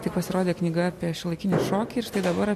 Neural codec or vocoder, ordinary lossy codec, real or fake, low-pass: none; MP3, 64 kbps; real; 14.4 kHz